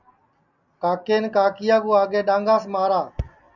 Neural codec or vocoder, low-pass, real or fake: none; 7.2 kHz; real